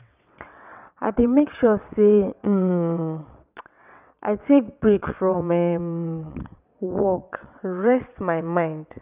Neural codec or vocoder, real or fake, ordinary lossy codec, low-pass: vocoder, 22.05 kHz, 80 mel bands, WaveNeXt; fake; none; 3.6 kHz